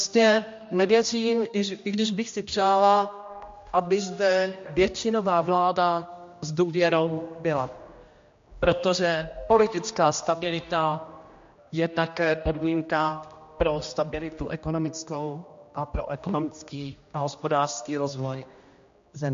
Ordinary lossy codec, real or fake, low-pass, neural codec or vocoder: MP3, 48 kbps; fake; 7.2 kHz; codec, 16 kHz, 1 kbps, X-Codec, HuBERT features, trained on general audio